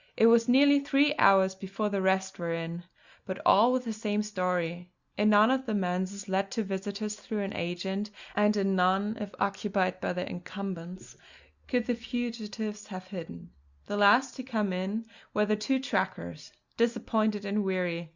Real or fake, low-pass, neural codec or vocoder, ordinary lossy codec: real; 7.2 kHz; none; Opus, 64 kbps